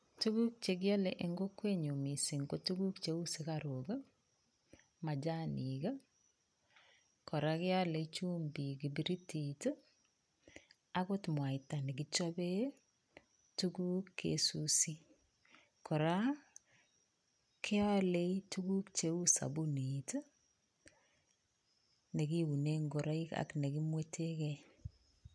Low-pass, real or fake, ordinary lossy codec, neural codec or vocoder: none; real; none; none